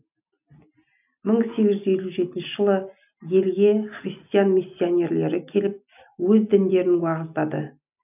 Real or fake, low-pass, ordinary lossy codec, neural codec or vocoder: real; 3.6 kHz; none; none